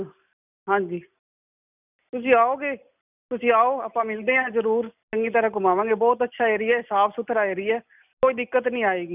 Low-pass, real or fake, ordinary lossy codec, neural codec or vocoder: 3.6 kHz; real; none; none